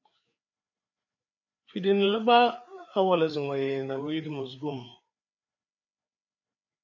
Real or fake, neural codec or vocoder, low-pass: fake; codec, 16 kHz, 4 kbps, FreqCodec, larger model; 7.2 kHz